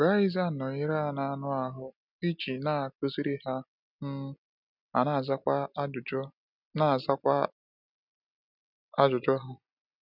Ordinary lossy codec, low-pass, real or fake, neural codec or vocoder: none; 5.4 kHz; real; none